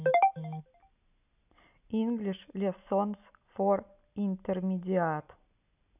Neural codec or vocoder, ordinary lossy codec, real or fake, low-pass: none; none; real; 3.6 kHz